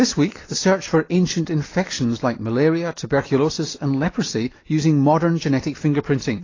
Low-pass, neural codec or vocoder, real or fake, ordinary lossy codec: 7.2 kHz; none; real; AAC, 32 kbps